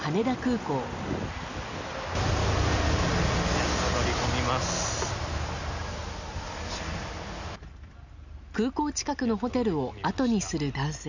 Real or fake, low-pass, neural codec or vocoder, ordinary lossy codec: real; 7.2 kHz; none; none